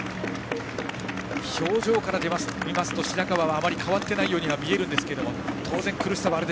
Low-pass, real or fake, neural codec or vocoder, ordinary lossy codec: none; real; none; none